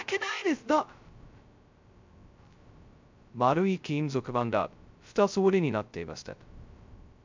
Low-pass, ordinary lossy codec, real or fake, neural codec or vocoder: 7.2 kHz; MP3, 64 kbps; fake; codec, 16 kHz, 0.2 kbps, FocalCodec